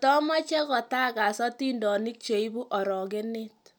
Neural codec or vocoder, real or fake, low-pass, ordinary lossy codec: none; real; none; none